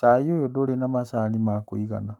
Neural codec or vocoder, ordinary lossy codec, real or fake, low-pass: codec, 44.1 kHz, 7.8 kbps, Pupu-Codec; none; fake; 19.8 kHz